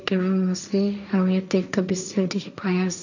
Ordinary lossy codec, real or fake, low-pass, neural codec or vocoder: none; fake; 7.2 kHz; codec, 16 kHz, 1.1 kbps, Voila-Tokenizer